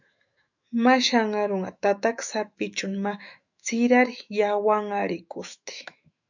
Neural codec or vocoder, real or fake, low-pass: autoencoder, 48 kHz, 128 numbers a frame, DAC-VAE, trained on Japanese speech; fake; 7.2 kHz